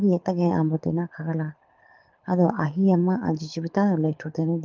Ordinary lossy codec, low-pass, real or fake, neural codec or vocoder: Opus, 32 kbps; 7.2 kHz; fake; codec, 24 kHz, 6 kbps, HILCodec